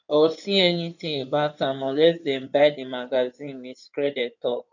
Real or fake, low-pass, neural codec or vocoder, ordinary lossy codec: fake; 7.2 kHz; codec, 16 kHz in and 24 kHz out, 2.2 kbps, FireRedTTS-2 codec; none